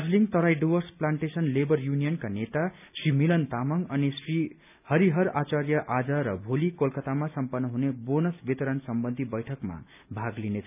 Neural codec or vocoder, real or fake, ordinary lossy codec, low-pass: none; real; none; 3.6 kHz